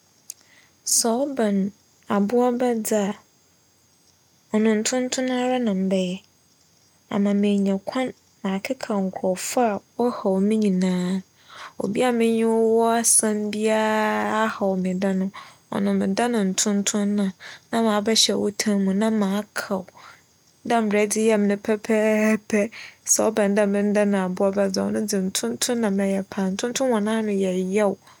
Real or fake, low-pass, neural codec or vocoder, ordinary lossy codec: real; 19.8 kHz; none; none